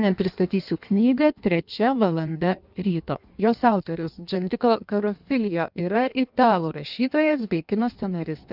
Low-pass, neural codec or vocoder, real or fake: 5.4 kHz; codec, 16 kHz in and 24 kHz out, 1.1 kbps, FireRedTTS-2 codec; fake